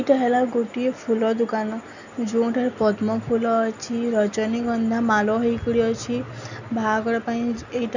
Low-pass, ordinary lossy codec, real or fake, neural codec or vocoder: 7.2 kHz; none; real; none